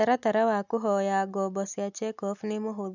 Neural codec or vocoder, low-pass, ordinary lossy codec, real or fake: none; 7.2 kHz; none; real